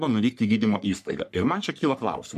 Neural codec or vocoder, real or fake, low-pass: codec, 44.1 kHz, 3.4 kbps, Pupu-Codec; fake; 14.4 kHz